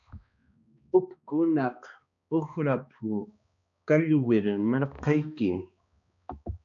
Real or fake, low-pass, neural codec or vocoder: fake; 7.2 kHz; codec, 16 kHz, 2 kbps, X-Codec, HuBERT features, trained on balanced general audio